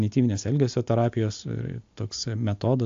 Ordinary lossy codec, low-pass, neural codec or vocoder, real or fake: AAC, 48 kbps; 7.2 kHz; none; real